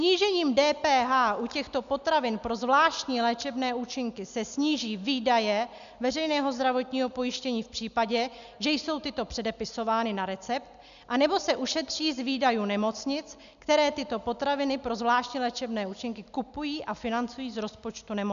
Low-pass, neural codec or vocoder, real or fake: 7.2 kHz; none; real